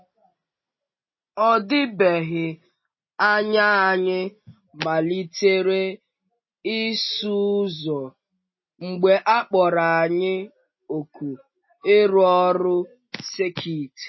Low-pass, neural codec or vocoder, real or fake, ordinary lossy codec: 7.2 kHz; none; real; MP3, 24 kbps